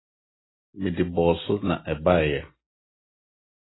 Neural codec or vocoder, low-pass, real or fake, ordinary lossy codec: none; 7.2 kHz; real; AAC, 16 kbps